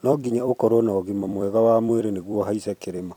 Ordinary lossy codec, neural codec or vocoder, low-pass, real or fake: none; vocoder, 44.1 kHz, 128 mel bands every 256 samples, BigVGAN v2; 19.8 kHz; fake